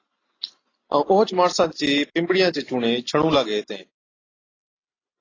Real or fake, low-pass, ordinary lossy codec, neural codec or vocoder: real; 7.2 kHz; AAC, 32 kbps; none